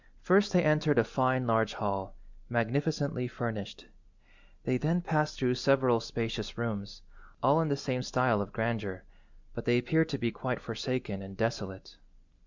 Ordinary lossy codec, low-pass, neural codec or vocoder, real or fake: Opus, 64 kbps; 7.2 kHz; none; real